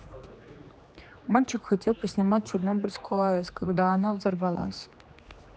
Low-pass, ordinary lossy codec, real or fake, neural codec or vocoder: none; none; fake; codec, 16 kHz, 2 kbps, X-Codec, HuBERT features, trained on general audio